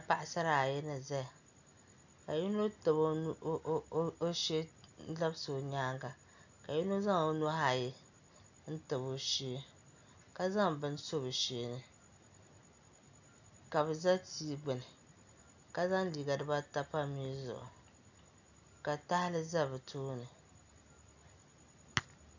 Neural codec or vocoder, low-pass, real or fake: none; 7.2 kHz; real